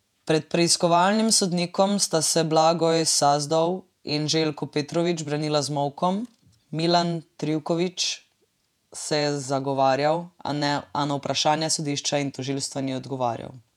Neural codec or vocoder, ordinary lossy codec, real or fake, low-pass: vocoder, 48 kHz, 128 mel bands, Vocos; none; fake; 19.8 kHz